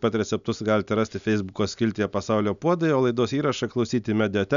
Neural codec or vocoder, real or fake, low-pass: none; real; 7.2 kHz